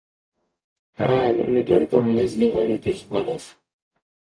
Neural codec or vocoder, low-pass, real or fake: codec, 44.1 kHz, 0.9 kbps, DAC; 9.9 kHz; fake